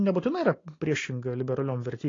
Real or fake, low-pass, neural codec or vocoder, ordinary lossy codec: real; 7.2 kHz; none; AAC, 32 kbps